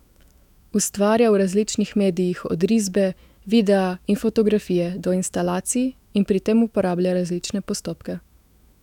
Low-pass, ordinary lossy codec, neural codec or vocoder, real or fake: 19.8 kHz; none; autoencoder, 48 kHz, 128 numbers a frame, DAC-VAE, trained on Japanese speech; fake